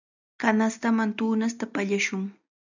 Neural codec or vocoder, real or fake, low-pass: none; real; 7.2 kHz